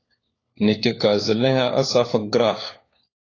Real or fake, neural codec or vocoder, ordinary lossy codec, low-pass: fake; codec, 16 kHz, 4 kbps, FunCodec, trained on LibriTTS, 50 frames a second; AAC, 32 kbps; 7.2 kHz